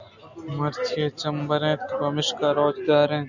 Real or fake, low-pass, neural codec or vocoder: real; 7.2 kHz; none